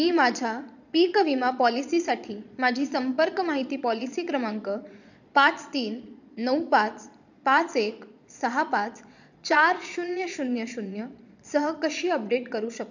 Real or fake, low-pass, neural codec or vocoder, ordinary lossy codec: fake; 7.2 kHz; vocoder, 22.05 kHz, 80 mel bands, Vocos; none